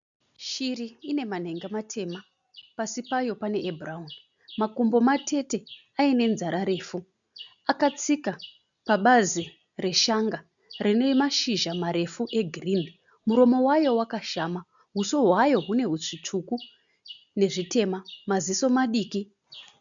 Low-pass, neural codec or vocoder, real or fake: 7.2 kHz; none; real